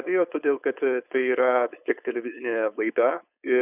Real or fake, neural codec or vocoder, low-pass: fake; codec, 16 kHz, 4.8 kbps, FACodec; 3.6 kHz